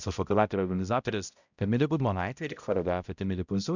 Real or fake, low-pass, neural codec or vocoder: fake; 7.2 kHz; codec, 16 kHz, 0.5 kbps, X-Codec, HuBERT features, trained on balanced general audio